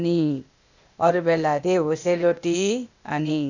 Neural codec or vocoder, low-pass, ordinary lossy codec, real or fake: codec, 16 kHz, 0.8 kbps, ZipCodec; 7.2 kHz; none; fake